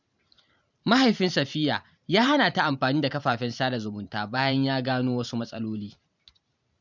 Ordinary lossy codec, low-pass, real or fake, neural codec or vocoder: none; 7.2 kHz; fake; vocoder, 44.1 kHz, 128 mel bands every 512 samples, BigVGAN v2